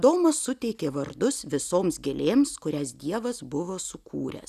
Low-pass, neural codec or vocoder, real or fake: 14.4 kHz; none; real